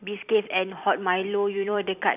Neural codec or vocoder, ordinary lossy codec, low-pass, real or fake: vocoder, 44.1 kHz, 128 mel bands every 256 samples, BigVGAN v2; none; 3.6 kHz; fake